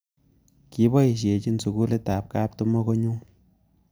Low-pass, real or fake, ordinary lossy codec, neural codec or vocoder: none; real; none; none